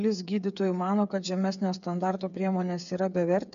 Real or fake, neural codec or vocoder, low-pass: fake; codec, 16 kHz, 8 kbps, FreqCodec, smaller model; 7.2 kHz